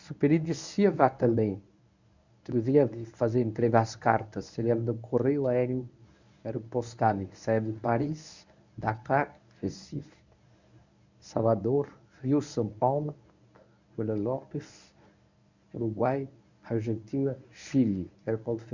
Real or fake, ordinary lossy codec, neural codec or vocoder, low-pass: fake; none; codec, 24 kHz, 0.9 kbps, WavTokenizer, medium speech release version 1; 7.2 kHz